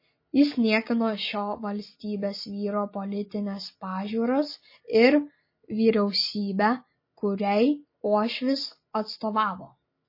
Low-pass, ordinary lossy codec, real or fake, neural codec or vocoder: 5.4 kHz; MP3, 24 kbps; real; none